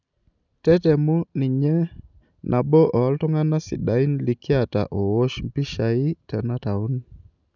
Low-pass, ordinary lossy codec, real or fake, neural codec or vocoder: 7.2 kHz; none; real; none